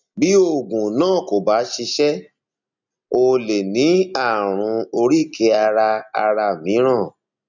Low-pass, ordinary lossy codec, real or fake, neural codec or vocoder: 7.2 kHz; none; real; none